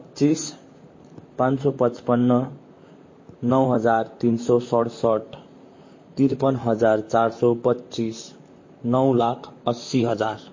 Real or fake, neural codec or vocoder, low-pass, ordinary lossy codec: fake; vocoder, 44.1 kHz, 128 mel bands, Pupu-Vocoder; 7.2 kHz; MP3, 32 kbps